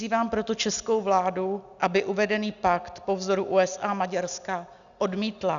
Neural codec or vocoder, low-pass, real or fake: none; 7.2 kHz; real